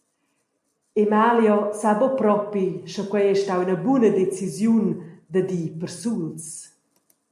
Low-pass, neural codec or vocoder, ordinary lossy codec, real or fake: 14.4 kHz; none; MP3, 64 kbps; real